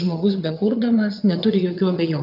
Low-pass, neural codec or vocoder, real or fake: 5.4 kHz; vocoder, 22.05 kHz, 80 mel bands, WaveNeXt; fake